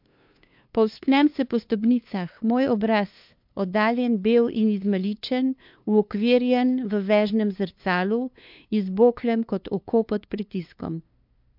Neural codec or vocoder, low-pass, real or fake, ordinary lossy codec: codec, 16 kHz, 2 kbps, FunCodec, trained on LibriTTS, 25 frames a second; 5.4 kHz; fake; MP3, 48 kbps